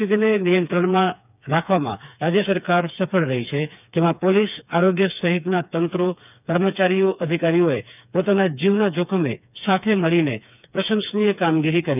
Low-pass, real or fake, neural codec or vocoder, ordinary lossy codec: 3.6 kHz; fake; codec, 16 kHz, 4 kbps, FreqCodec, smaller model; none